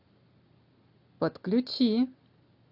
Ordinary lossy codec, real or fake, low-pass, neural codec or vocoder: AAC, 48 kbps; real; 5.4 kHz; none